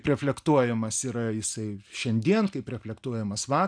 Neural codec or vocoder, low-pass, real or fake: none; 9.9 kHz; real